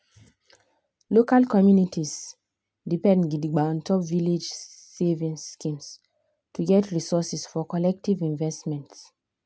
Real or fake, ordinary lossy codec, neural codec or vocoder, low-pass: real; none; none; none